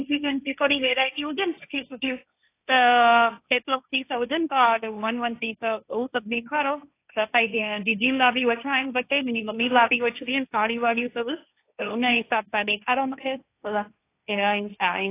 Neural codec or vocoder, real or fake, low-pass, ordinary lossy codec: codec, 16 kHz, 1.1 kbps, Voila-Tokenizer; fake; 3.6 kHz; AAC, 24 kbps